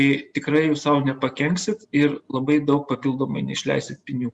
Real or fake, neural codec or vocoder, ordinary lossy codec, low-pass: real; none; Opus, 64 kbps; 10.8 kHz